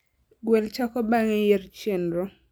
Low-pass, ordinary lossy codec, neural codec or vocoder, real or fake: none; none; none; real